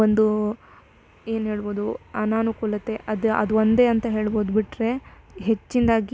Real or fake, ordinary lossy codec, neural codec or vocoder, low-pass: real; none; none; none